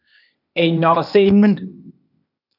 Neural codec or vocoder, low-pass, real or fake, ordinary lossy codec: codec, 16 kHz, 0.8 kbps, ZipCodec; 5.4 kHz; fake; AAC, 48 kbps